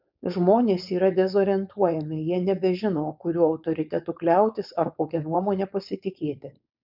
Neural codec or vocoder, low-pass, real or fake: codec, 16 kHz, 4.8 kbps, FACodec; 5.4 kHz; fake